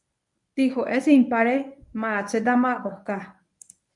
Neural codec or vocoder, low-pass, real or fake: codec, 24 kHz, 0.9 kbps, WavTokenizer, medium speech release version 1; 10.8 kHz; fake